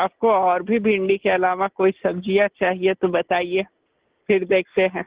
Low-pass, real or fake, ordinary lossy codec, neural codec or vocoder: 3.6 kHz; real; Opus, 16 kbps; none